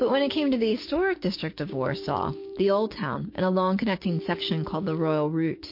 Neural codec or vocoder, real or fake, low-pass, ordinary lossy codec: codec, 16 kHz, 6 kbps, DAC; fake; 5.4 kHz; MP3, 32 kbps